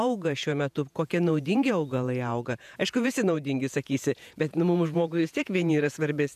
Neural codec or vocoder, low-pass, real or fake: vocoder, 48 kHz, 128 mel bands, Vocos; 14.4 kHz; fake